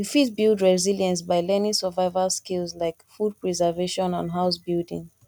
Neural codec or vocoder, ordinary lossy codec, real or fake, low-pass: none; none; real; 19.8 kHz